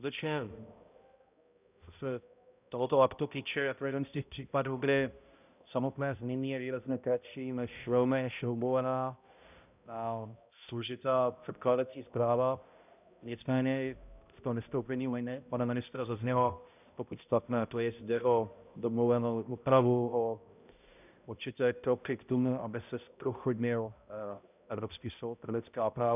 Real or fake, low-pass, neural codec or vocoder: fake; 3.6 kHz; codec, 16 kHz, 0.5 kbps, X-Codec, HuBERT features, trained on balanced general audio